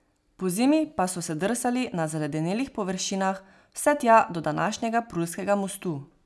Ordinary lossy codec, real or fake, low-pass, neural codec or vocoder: none; real; none; none